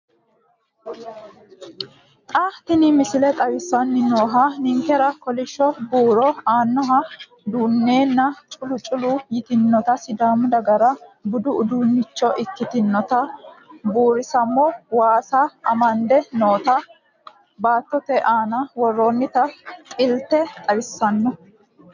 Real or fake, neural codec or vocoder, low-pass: real; none; 7.2 kHz